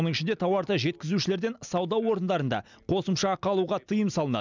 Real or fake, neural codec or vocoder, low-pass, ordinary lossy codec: real; none; 7.2 kHz; none